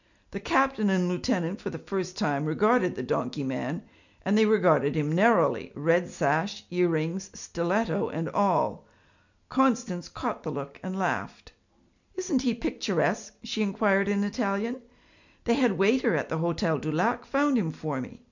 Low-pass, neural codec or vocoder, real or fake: 7.2 kHz; none; real